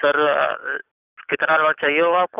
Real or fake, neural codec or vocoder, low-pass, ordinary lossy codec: real; none; 3.6 kHz; none